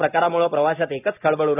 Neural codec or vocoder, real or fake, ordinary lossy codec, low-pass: none; real; AAC, 32 kbps; 3.6 kHz